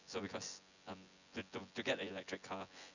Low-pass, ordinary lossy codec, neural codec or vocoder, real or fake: 7.2 kHz; none; vocoder, 24 kHz, 100 mel bands, Vocos; fake